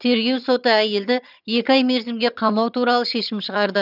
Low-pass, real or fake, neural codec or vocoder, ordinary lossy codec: 5.4 kHz; fake; vocoder, 22.05 kHz, 80 mel bands, HiFi-GAN; none